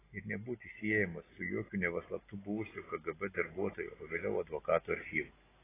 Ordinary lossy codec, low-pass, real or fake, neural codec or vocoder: AAC, 16 kbps; 3.6 kHz; real; none